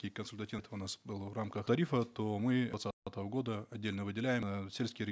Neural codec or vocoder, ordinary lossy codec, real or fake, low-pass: none; none; real; none